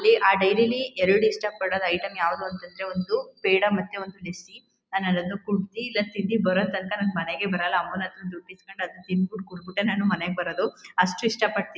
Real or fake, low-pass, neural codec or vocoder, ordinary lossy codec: real; none; none; none